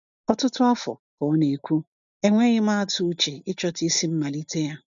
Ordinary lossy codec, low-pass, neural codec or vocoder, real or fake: none; 7.2 kHz; none; real